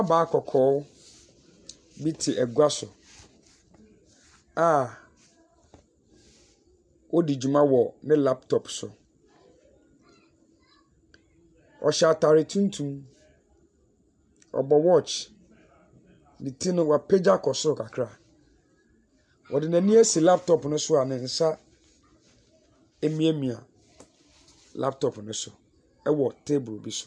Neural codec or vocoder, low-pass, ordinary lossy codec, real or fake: none; 9.9 kHz; MP3, 96 kbps; real